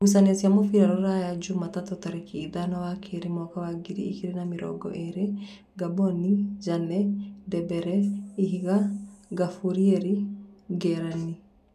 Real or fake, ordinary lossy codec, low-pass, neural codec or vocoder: real; none; 14.4 kHz; none